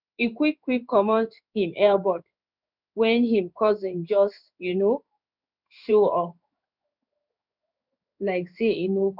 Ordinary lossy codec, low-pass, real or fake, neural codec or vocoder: none; 5.4 kHz; fake; codec, 16 kHz in and 24 kHz out, 1 kbps, XY-Tokenizer